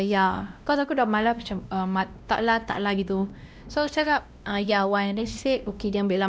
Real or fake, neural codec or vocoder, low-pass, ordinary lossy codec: fake; codec, 16 kHz, 1 kbps, X-Codec, WavLM features, trained on Multilingual LibriSpeech; none; none